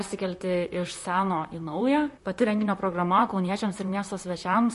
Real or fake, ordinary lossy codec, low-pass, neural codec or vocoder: fake; MP3, 48 kbps; 14.4 kHz; vocoder, 44.1 kHz, 128 mel bands, Pupu-Vocoder